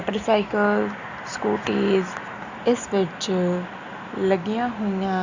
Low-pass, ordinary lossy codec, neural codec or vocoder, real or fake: 7.2 kHz; Opus, 64 kbps; none; real